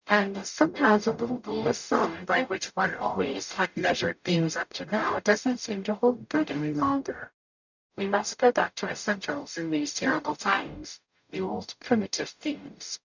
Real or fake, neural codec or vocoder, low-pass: fake; codec, 44.1 kHz, 0.9 kbps, DAC; 7.2 kHz